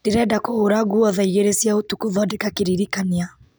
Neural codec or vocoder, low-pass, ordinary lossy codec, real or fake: none; none; none; real